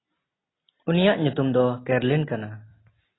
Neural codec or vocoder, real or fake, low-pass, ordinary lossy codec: none; real; 7.2 kHz; AAC, 16 kbps